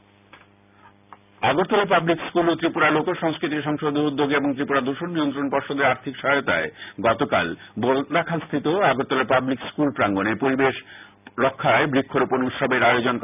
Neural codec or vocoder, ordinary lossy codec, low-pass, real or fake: none; none; 3.6 kHz; real